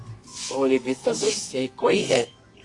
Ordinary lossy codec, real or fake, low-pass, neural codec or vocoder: AAC, 48 kbps; fake; 10.8 kHz; codec, 24 kHz, 0.9 kbps, WavTokenizer, medium music audio release